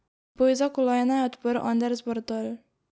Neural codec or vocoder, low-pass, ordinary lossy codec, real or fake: none; none; none; real